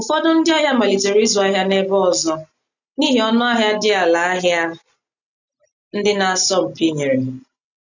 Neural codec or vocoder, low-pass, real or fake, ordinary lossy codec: none; 7.2 kHz; real; none